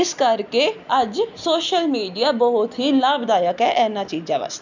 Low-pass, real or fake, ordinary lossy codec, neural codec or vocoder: 7.2 kHz; real; none; none